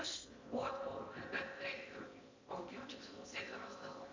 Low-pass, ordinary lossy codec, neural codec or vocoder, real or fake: 7.2 kHz; MP3, 64 kbps; codec, 16 kHz in and 24 kHz out, 0.6 kbps, FocalCodec, streaming, 4096 codes; fake